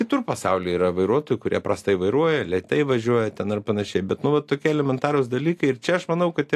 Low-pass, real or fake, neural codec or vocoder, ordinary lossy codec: 14.4 kHz; real; none; AAC, 64 kbps